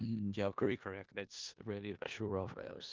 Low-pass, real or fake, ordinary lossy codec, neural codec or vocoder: 7.2 kHz; fake; Opus, 32 kbps; codec, 16 kHz in and 24 kHz out, 0.4 kbps, LongCat-Audio-Codec, four codebook decoder